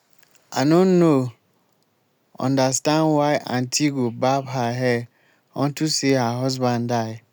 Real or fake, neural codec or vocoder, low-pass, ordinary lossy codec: real; none; none; none